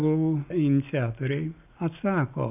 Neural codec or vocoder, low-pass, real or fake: none; 3.6 kHz; real